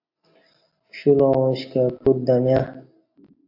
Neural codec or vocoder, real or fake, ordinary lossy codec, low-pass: none; real; AAC, 32 kbps; 5.4 kHz